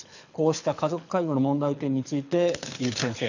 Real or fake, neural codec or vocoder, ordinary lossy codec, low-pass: fake; codec, 24 kHz, 6 kbps, HILCodec; none; 7.2 kHz